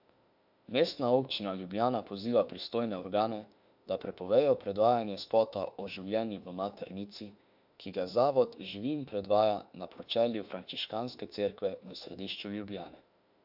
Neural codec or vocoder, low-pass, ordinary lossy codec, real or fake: autoencoder, 48 kHz, 32 numbers a frame, DAC-VAE, trained on Japanese speech; 5.4 kHz; AAC, 48 kbps; fake